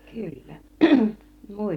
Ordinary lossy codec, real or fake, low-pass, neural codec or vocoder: none; real; 19.8 kHz; none